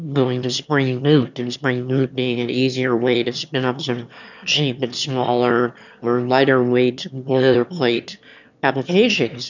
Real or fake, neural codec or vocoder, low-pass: fake; autoencoder, 22.05 kHz, a latent of 192 numbers a frame, VITS, trained on one speaker; 7.2 kHz